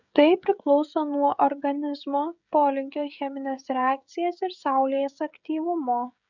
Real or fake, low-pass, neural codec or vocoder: fake; 7.2 kHz; codec, 16 kHz, 16 kbps, FreqCodec, smaller model